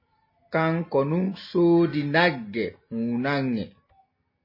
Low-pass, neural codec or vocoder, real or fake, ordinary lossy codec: 5.4 kHz; none; real; MP3, 24 kbps